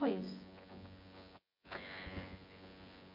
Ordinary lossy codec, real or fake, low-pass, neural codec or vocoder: MP3, 48 kbps; fake; 5.4 kHz; vocoder, 24 kHz, 100 mel bands, Vocos